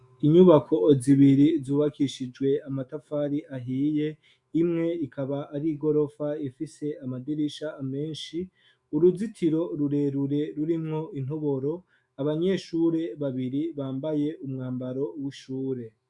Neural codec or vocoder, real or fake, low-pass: autoencoder, 48 kHz, 128 numbers a frame, DAC-VAE, trained on Japanese speech; fake; 10.8 kHz